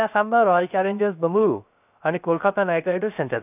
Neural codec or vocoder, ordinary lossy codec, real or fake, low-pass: codec, 16 kHz, 0.3 kbps, FocalCodec; none; fake; 3.6 kHz